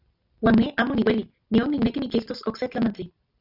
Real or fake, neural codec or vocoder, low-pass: real; none; 5.4 kHz